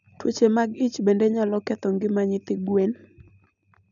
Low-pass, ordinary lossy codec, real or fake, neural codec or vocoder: 7.2 kHz; none; real; none